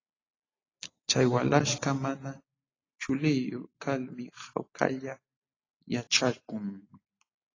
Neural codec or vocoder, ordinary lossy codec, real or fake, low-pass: none; AAC, 32 kbps; real; 7.2 kHz